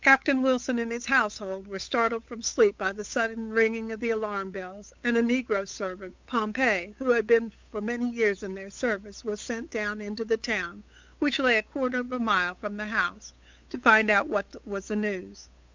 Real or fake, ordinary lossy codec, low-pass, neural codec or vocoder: fake; MP3, 64 kbps; 7.2 kHz; codec, 16 kHz, 8 kbps, FunCodec, trained on Chinese and English, 25 frames a second